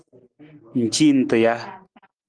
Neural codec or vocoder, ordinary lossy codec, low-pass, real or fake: none; Opus, 24 kbps; 9.9 kHz; real